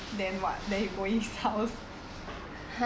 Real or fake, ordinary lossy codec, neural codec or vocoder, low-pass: real; none; none; none